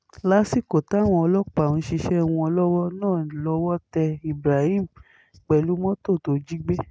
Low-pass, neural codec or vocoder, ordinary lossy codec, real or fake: none; none; none; real